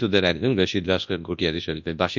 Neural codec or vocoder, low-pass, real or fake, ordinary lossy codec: codec, 24 kHz, 0.9 kbps, WavTokenizer, large speech release; 7.2 kHz; fake; MP3, 64 kbps